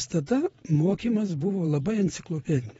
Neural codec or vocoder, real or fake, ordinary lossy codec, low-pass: vocoder, 22.05 kHz, 80 mel bands, Vocos; fake; AAC, 24 kbps; 9.9 kHz